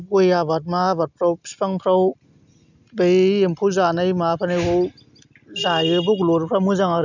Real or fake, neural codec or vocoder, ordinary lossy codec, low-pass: real; none; none; 7.2 kHz